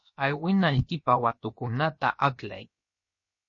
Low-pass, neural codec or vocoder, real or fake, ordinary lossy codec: 7.2 kHz; codec, 16 kHz, about 1 kbps, DyCAST, with the encoder's durations; fake; MP3, 32 kbps